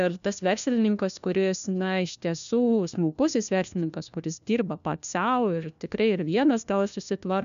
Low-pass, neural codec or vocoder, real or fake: 7.2 kHz; codec, 16 kHz, 1 kbps, FunCodec, trained on LibriTTS, 50 frames a second; fake